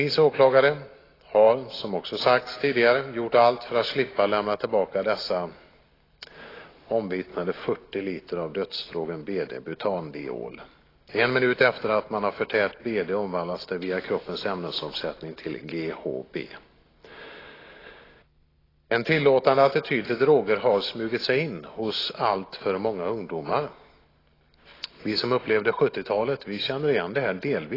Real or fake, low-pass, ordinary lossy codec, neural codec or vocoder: real; 5.4 kHz; AAC, 24 kbps; none